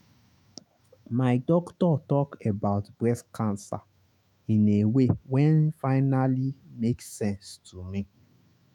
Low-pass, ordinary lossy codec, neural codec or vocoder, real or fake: 19.8 kHz; none; autoencoder, 48 kHz, 128 numbers a frame, DAC-VAE, trained on Japanese speech; fake